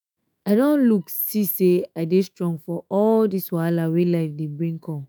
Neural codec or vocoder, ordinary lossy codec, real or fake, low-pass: autoencoder, 48 kHz, 128 numbers a frame, DAC-VAE, trained on Japanese speech; none; fake; none